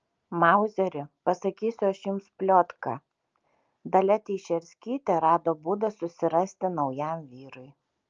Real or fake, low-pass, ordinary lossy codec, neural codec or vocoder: real; 7.2 kHz; Opus, 24 kbps; none